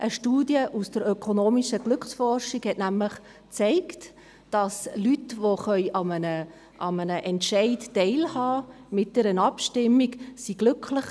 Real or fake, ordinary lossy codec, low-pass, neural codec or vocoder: real; none; none; none